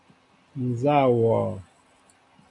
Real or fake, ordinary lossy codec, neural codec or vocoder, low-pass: real; MP3, 96 kbps; none; 10.8 kHz